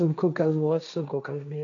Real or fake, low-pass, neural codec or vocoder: fake; 7.2 kHz; codec, 16 kHz, 1.1 kbps, Voila-Tokenizer